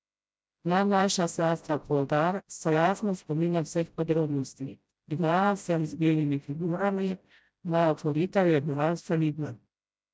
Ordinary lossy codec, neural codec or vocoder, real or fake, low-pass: none; codec, 16 kHz, 0.5 kbps, FreqCodec, smaller model; fake; none